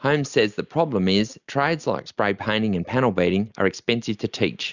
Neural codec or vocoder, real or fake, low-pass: none; real; 7.2 kHz